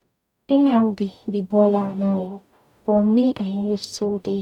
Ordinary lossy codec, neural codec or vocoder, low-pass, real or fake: none; codec, 44.1 kHz, 0.9 kbps, DAC; 19.8 kHz; fake